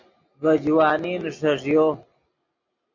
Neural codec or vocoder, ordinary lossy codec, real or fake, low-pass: none; MP3, 64 kbps; real; 7.2 kHz